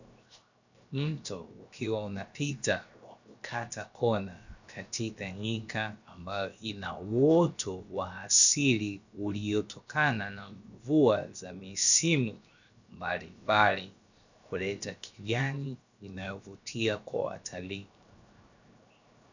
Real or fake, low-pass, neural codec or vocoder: fake; 7.2 kHz; codec, 16 kHz, 0.7 kbps, FocalCodec